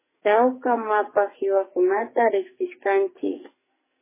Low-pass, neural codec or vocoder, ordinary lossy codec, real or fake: 3.6 kHz; codec, 44.1 kHz, 2.6 kbps, SNAC; MP3, 16 kbps; fake